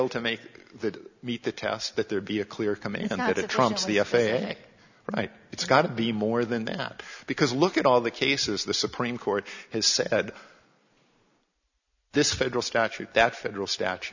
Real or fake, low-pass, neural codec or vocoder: real; 7.2 kHz; none